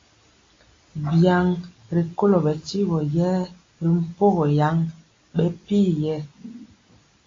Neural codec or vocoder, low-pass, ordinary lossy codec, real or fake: none; 7.2 kHz; AAC, 32 kbps; real